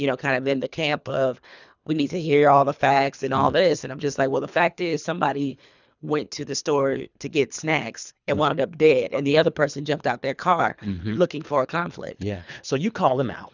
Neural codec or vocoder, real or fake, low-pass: codec, 24 kHz, 3 kbps, HILCodec; fake; 7.2 kHz